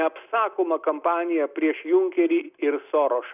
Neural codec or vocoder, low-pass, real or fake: none; 3.6 kHz; real